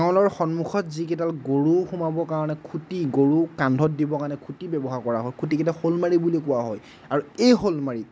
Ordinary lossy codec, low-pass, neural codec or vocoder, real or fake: none; none; none; real